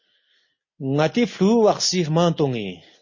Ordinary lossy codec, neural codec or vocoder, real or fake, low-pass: MP3, 32 kbps; none; real; 7.2 kHz